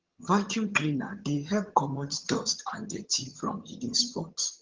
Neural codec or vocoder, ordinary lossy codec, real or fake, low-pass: vocoder, 22.05 kHz, 80 mel bands, HiFi-GAN; Opus, 16 kbps; fake; 7.2 kHz